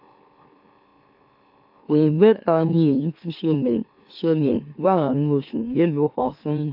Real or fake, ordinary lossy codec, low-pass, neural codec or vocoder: fake; AAC, 48 kbps; 5.4 kHz; autoencoder, 44.1 kHz, a latent of 192 numbers a frame, MeloTTS